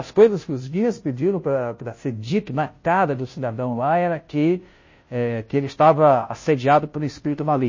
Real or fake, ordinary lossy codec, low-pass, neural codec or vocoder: fake; MP3, 32 kbps; 7.2 kHz; codec, 16 kHz, 0.5 kbps, FunCodec, trained on Chinese and English, 25 frames a second